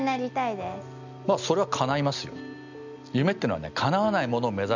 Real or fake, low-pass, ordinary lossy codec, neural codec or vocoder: real; 7.2 kHz; none; none